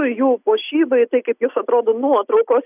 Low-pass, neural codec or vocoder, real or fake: 3.6 kHz; none; real